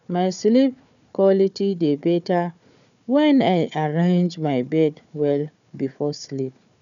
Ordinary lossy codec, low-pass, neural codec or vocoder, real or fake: none; 7.2 kHz; codec, 16 kHz, 4 kbps, FunCodec, trained on Chinese and English, 50 frames a second; fake